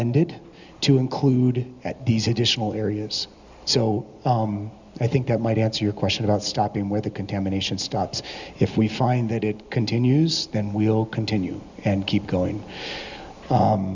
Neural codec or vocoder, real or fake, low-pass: none; real; 7.2 kHz